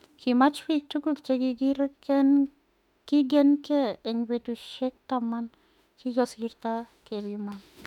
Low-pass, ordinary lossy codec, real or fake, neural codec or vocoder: 19.8 kHz; none; fake; autoencoder, 48 kHz, 32 numbers a frame, DAC-VAE, trained on Japanese speech